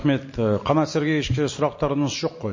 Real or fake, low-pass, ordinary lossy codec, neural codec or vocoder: real; 7.2 kHz; MP3, 32 kbps; none